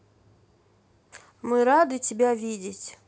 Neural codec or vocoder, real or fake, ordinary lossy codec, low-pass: none; real; none; none